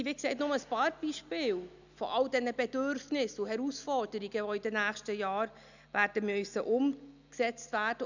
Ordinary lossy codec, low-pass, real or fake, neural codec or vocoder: none; 7.2 kHz; real; none